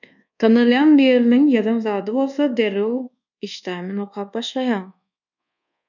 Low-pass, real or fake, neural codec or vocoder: 7.2 kHz; fake; codec, 24 kHz, 1.2 kbps, DualCodec